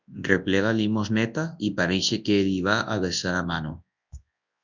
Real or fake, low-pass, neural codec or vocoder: fake; 7.2 kHz; codec, 24 kHz, 0.9 kbps, WavTokenizer, large speech release